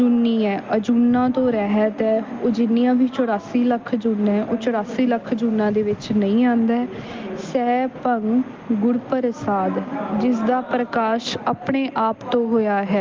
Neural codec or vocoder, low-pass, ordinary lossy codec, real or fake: none; 7.2 kHz; Opus, 32 kbps; real